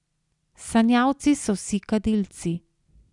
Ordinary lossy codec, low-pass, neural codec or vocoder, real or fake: none; 10.8 kHz; none; real